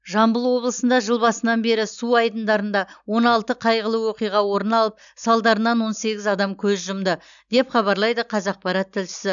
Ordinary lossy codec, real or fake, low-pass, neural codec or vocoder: none; real; 7.2 kHz; none